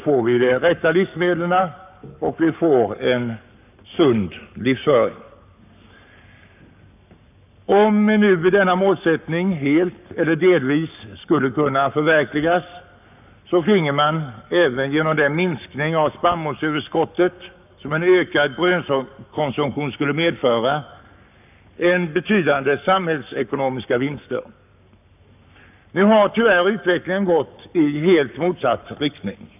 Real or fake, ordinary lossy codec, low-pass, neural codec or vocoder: fake; none; 3.6 kHz; codec, 44.1 kHz, 7.8 kbps, Pupu-Codec